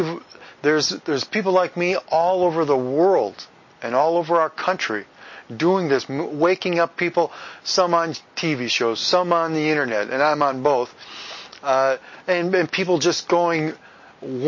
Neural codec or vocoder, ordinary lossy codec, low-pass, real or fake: none; MP3, 32 kbps; 7.2 kHz; real